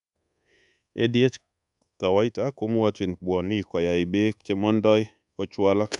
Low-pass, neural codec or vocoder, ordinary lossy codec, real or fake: 10.8 kHz; codec, 24 kHz, 1.2 kbps, DualCodec; none; fake